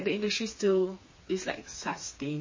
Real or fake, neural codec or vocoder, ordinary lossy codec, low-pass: fake; codec, 16 kHz, 4 kbps, FreqCodec, smaller model; MP3, 32 kbps; 7.2 kHz